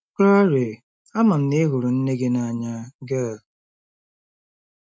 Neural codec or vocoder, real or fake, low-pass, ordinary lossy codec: none; real; none; none